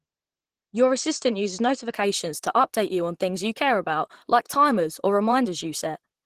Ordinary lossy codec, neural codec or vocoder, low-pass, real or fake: Opus, 16 kbps; vocoder, 44.1 kHz, 128 mel bands, Pupu-Vocoder; 14.4 kHz; fake